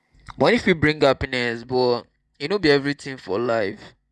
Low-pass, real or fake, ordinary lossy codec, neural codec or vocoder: none; fake; none; vocoder, 24 kHz, 100 mel bands, Vocos